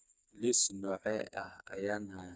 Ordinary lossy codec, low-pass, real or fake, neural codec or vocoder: none; none; fake; codec, 16 kHz, 4 kbps, FreqCodec, smaller model